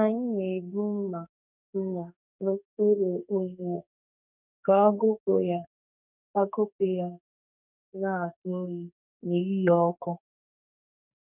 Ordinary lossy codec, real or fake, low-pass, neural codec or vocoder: none; fake; 3.6 kHz; codec, 32 kHz, 1.9 kbps, SNAC